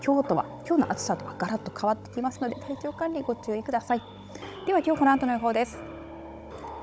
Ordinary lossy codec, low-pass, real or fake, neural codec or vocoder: none; none; fake; codec, 16 kHz, 16 kbps, FunCodec, trained on Chinese and English, 50 frames a second